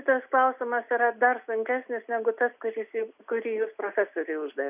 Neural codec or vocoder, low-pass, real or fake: none; 3.6 kHz; real